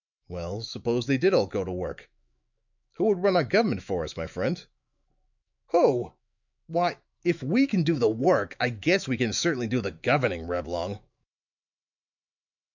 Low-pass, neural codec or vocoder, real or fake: 7.2 kHz; autoencoder, 48 kHz, 128 numbers a frame, DAC-VAE, trained on Japanese speech; fake